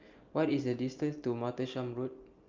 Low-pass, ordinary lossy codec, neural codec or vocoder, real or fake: 7.2 kHz; Opus, 32 kbps; none; real